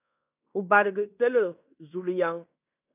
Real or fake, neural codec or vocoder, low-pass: fake; codec, 16 kHz in and 24 kHz out, 0.9 kbps, LongCat-Audio-Codec, fine tuned four codebook decoder; 3.6 kHz